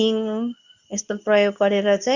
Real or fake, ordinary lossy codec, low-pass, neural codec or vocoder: fake; none; 7.2 kHz; codec, 24 kHz, 0.9 kbps, WavTokenizer, medium speech release version 2